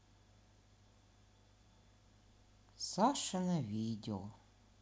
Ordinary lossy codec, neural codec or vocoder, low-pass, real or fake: none; none; none; real